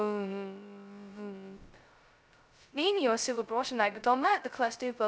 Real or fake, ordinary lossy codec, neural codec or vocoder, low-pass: fake; none; codec, 16 kHz, 0.2 kbps, FocalCodec; none